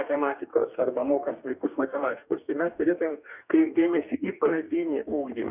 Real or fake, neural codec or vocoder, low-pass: fake; codec, 44.1 kHz, 2.6 kbps, DAC; 3.6 kHz